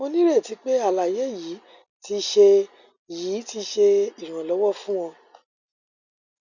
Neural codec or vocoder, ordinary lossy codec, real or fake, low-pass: none; none; real; 7.2 kHz